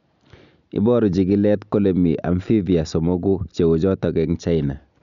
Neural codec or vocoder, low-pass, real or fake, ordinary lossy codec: none; 7.2 kHz; real; none